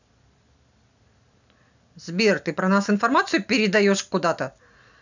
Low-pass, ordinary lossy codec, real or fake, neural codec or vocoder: 7.2 kHz; none; real; none